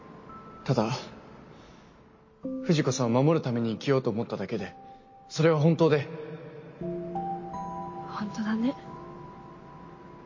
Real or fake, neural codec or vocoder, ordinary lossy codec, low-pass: real; none; MP3, 32 kbps; 7.2 kHz